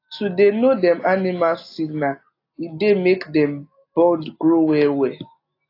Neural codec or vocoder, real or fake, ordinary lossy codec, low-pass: none; real; AAC, 32 kbps; 5.4 kHz